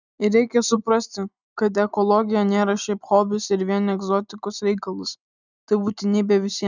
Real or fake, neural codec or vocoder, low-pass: real; none; 7.2 kHz